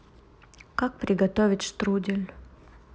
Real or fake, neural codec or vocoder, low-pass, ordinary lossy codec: real; none; none; none